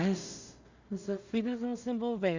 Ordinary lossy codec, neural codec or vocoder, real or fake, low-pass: Opus, 64 kbps; codec, 16 kHz in and 24 kHz out, 0.4 kbps, LongCat-Audio-Codec, two codebook decoder; fake; 7.2 kHz